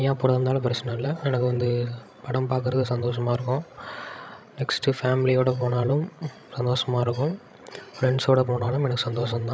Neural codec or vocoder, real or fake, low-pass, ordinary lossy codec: codec, 16 kHz, 16 kbps, FreqCodec, larger model; fake; none; none